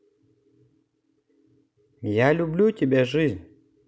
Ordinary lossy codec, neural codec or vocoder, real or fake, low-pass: none; none; real; none